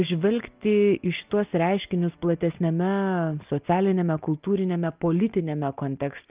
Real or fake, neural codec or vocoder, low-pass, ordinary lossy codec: real; none; 3.6 kHz; Opus, 24 kbps